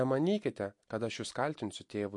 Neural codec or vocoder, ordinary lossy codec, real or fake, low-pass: none; MP3, 48 kbps; real; 10.8 kHz